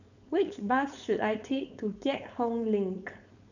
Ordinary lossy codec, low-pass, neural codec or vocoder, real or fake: none; 7.2 kHz; codec, 16 kHz, 4.8 kbps, FACodec; fake